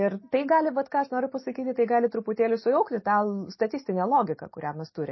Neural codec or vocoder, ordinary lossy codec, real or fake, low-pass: none; MP3, 24 kbps; real; 7.2 kHz